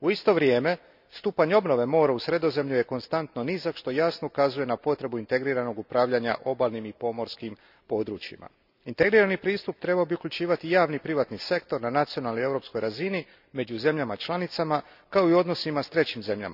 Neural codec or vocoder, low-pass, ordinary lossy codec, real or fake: none; 5.4 kHz; none; real